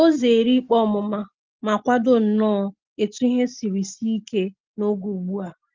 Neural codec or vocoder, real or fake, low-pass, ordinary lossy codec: none; real; 7.2 kHz; Opus, 32 kbps